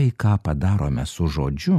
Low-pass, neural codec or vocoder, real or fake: 14.4 kHz; none; real